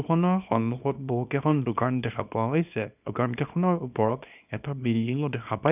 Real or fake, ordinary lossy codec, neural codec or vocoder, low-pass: fake; none; codec, 24 kHz, 0.9 kbps, WavTokenizer, small release; 3.6 kHz